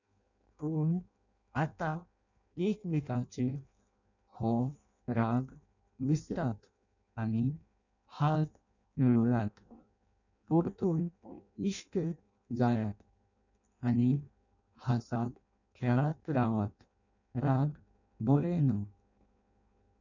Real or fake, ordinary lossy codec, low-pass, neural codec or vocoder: fake; none; 7.2 kHz; codec, 16 kHz in and 24 kHz out, 0.6 kbps, FireRedTTS-2 codec